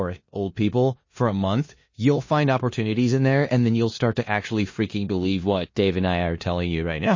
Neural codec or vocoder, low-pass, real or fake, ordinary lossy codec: codec, 16 kHz in and 24 kHz out, 0.9 kbps, LongCat-Audio-Codec, four codebook decoder; 7.2 kHz; fake; MP3, 32 kbps